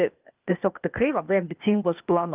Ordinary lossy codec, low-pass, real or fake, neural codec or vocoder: Opus, 24 kbps; 3.6 kHz; fake; codec, 16 kHz, 0.8 kbps, ZipCodec